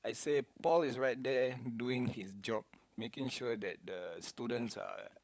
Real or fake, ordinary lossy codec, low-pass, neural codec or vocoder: fake; none; none; codec, 16 kHz, 16 kbps, FunCodec, trained on LibriTTS, 50 frames a second